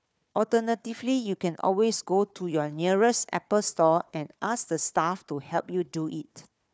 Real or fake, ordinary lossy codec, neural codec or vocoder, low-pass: fake; none; codec, 16 kHz, 4 kbps, FunCodec, trained on Chinese and English, 50 frames a second; none